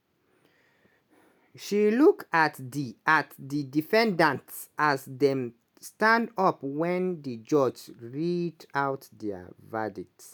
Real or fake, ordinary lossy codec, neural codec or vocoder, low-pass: real; none; none; none